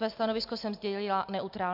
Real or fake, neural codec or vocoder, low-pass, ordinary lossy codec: real; none; 5.4 kHz; MP3, 48 kbps